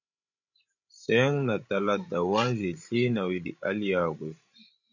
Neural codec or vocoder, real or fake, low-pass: codec, 16 kHz, 16 kbps, FreqCodec, larger model; fake; 7.2 kHz